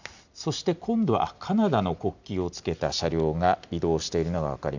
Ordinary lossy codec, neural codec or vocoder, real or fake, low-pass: none; none; real; 7.2 kHz